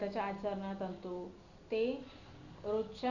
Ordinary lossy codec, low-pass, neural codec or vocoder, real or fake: none; 7.2 kHz; none; real